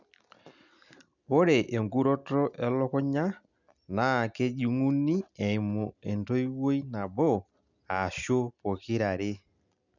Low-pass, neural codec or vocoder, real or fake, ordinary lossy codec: 7.2 kHz; none; real; none